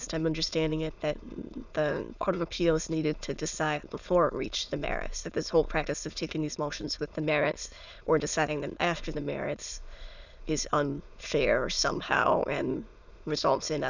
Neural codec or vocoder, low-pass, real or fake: autoencoder, 22.05 kHz, a latent of 192 numbers a frame, VITS, trained on many speakers; 7.2 kHz; fake